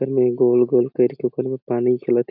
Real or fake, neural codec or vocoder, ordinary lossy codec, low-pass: real; none; none; 5.4 kHz